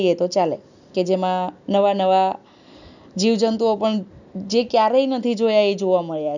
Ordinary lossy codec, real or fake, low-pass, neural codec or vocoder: none; real; 7.2 kHz; none